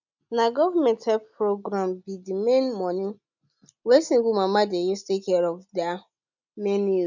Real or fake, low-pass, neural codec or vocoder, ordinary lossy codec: real; 7.2 kHz; none; none